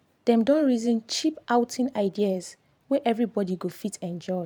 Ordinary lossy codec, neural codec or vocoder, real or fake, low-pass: none; vocoder, 44.1 kHz, 128 mel bands every 512 samples, BigVGAN v2; fake; 19.8 kHz